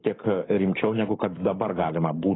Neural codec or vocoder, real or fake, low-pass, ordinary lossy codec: codec, 44.1 kHz, 7.8 kbps, Pupu-Codec; fake; 7.2 kHz; AAC, 16 kbps